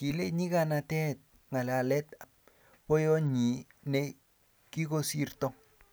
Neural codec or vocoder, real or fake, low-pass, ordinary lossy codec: none; real; none; none